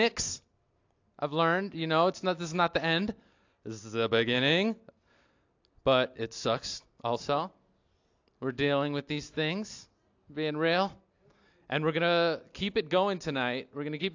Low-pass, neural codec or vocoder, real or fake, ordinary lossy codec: 7.2 kHz; none; real; AAC, 48 kbps